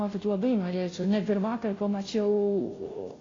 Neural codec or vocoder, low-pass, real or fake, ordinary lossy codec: codec, 16 kHz, 0.5 kbps, FunCodec, trained on Chinese and English, 25 frames a second; 7.2 kHz; fake; AAC, 32 kbps